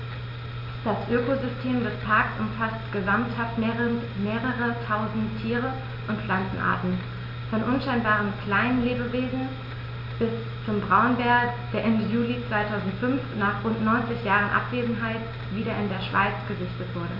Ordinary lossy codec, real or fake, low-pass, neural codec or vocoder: none; real; 5.4 kHz; none